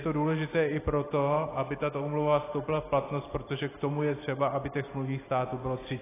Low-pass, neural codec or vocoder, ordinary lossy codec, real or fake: 3.6 kHz; none; AAC, 16 kbps; real